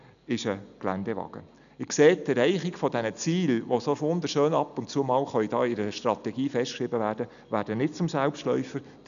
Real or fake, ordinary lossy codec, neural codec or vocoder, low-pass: real; none; none; 7.2 kHz